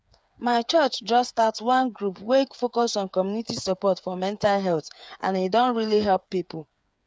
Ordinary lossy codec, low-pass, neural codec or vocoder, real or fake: none; none; codec, 16 kHz, 8 kbps, FreqCodec, smaller model; fake